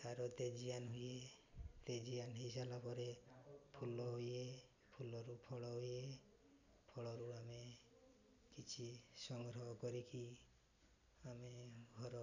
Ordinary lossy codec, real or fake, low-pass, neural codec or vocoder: none; real; 7.2 kHz; none